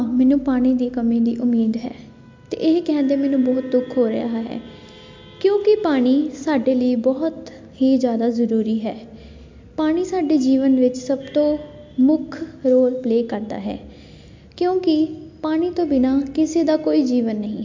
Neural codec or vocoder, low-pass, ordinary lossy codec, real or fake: none; 7.2 kHz; MP3, 48 kbps; real